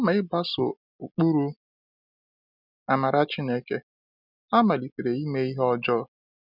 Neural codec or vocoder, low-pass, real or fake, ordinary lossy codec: none; 5.4 kHz; real; none